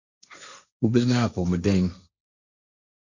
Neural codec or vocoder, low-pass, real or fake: codec, 16 kHz, 1.1 kbps, Voila-Tokenizer; 7.2 kHz; fake